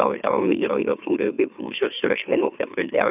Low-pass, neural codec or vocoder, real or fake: 3.6 kHz; autoencoder, 44.1 kHz, a latent of 192 numbers a frame, MeloTTS; fake